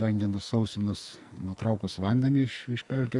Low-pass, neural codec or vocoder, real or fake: 10.8 kHz; codec, 44.1 kHz, 2.6 kbps, SNAC; fake